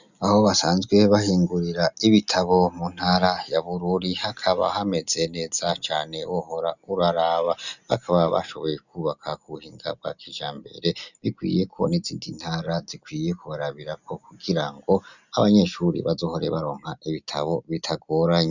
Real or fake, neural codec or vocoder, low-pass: real; none; 7.2 kHz